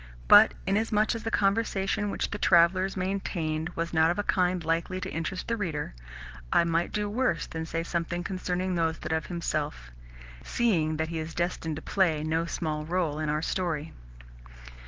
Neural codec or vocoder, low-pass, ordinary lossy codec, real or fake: none; 7.2 kHz; Opus, 16 kbps; real